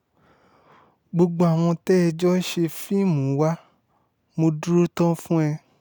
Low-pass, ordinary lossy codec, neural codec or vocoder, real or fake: none; none; none; real